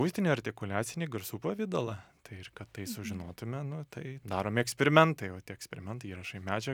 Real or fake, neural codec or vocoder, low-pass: real; none; 19.8 kHz